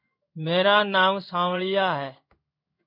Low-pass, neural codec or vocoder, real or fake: 5.4 kHz; codec, 16 kHz in and 24 kHz out, 1 kbps, XY-Tokenizer; fake